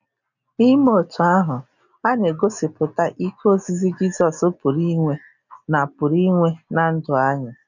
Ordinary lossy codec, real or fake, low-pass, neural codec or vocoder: none; real; 7.2 kHz; none